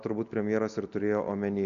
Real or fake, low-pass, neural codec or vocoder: real; 7.2 kHz; none